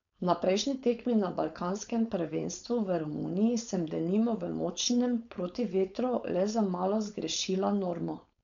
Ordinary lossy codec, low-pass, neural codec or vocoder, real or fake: none; 7.2 kHz; codec, 16 kHz, 4.8 kbps, FACodec; fake